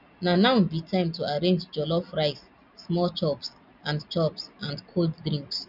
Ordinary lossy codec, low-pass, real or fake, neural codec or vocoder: none; 5.4 kHz; real; none